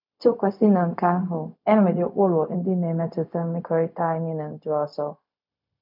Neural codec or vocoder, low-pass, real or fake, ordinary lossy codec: codec, 16 kHz, 0.4 kbps, LongCat-Audio-Codec; 5.4 kHz; fake; none